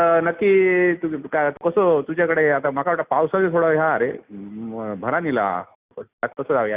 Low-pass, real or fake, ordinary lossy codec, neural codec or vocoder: 3.6 kHz; real; Opus, 64 kbps; none